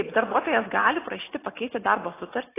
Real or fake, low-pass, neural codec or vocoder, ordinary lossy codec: real; 3.6 kHz; none; AAC, 16 kbps